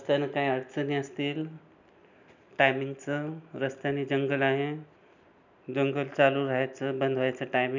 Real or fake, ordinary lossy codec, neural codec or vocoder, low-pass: real; none; none; 7.2 kHz